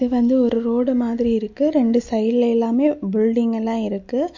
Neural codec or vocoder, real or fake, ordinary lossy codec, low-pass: none; real; MP3, 48 kbps; 7.2 kHz